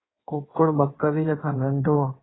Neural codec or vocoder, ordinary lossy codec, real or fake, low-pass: codec, 16 kHz in and 24 kHz out, 1.1 kbps, FireRedTTS-2 codec; AAC, 16 kbps; fake; 7.2 kHz